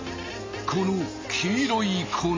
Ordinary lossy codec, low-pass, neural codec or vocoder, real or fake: MP3, 32 kbps; 7.2 kHz; none; real